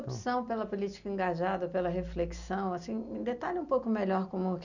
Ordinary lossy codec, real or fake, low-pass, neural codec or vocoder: none; real; 7.2 kHz; none